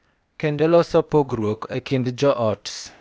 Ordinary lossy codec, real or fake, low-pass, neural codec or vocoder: none; fake; none; codec, 16 kHz, 0.8 kbps, ZipCodec